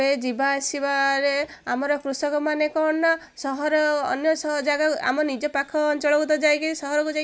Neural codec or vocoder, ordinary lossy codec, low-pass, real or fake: none; none; none; real